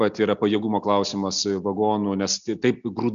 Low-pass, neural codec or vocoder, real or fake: 7.2 kHz; none; real